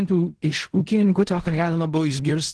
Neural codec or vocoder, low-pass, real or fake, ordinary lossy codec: codec, 16 kHz in and 24 kHz out, 0.4 kbps, LongCat-Audio-Codec, fine tuned four codebook decoder; 10.8 kHz; fake; Opus, 16 kbps